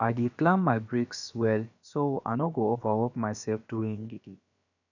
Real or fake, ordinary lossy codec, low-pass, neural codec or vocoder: fake; none; 7.2 kHz; codec, 16 kHz, about 1 kbps, DyCAST, with the encoder's durations